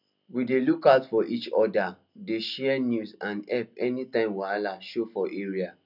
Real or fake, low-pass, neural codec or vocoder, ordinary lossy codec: real; 5.4 kHz; none; none